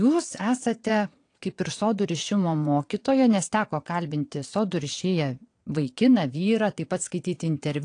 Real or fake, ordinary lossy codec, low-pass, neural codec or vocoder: fake; AAC, 48 kbps; 9.9 kHz; vocoder, 22.05 kHz, 80 mel bands, WaveNeXt